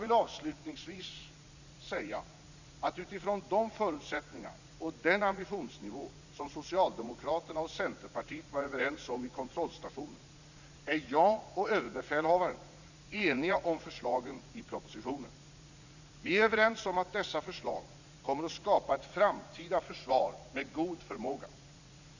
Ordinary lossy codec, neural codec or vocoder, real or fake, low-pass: none; vocoder, 44.1 kHz, 80 mel bands, Vocos; fake; 7.2 kHz